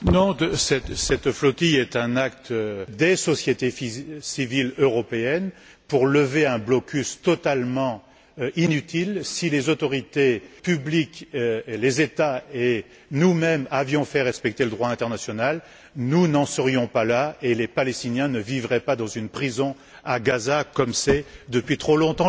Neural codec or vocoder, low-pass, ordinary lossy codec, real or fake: none; none; none; real